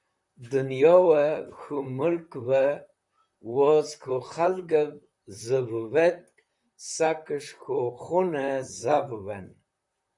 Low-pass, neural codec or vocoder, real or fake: 10.8 kHz; vocoder, 44.1 kHz, 128 mel bands, Pupu-Vocoder; fake